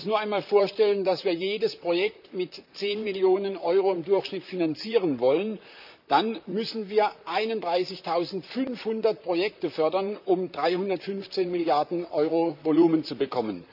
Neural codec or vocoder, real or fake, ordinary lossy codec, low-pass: vocoder, 44.1 kHz, 128 mel bands, Pupu-Vocoder; fake; none; 5.4 kHz